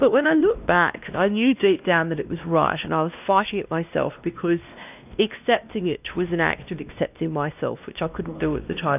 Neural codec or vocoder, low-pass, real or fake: codec, 16 kHz, 1 kbps, X-Codec, WavLM features, trained on Multilingual LibriSpeech; 3.6 kHz; fake